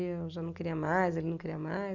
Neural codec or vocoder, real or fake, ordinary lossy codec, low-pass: none; real; none; 7.2 kHz